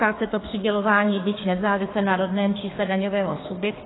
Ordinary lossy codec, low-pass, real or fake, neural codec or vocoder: AAC, 16 kbps; 7.2 kHz; fake; codec, 32 kHz, 1.9 kbps, SNAC